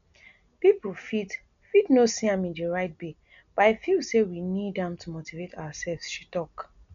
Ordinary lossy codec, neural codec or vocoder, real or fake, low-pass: none; none; real; 7.2 kHz